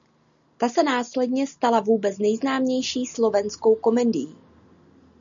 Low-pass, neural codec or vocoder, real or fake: 7.2 kHz; none; real